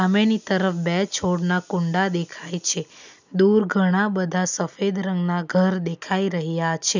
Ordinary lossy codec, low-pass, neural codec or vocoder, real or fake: none; 7.2 kHz; none; real